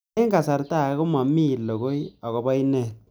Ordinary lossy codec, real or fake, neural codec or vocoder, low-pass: none; real; none; none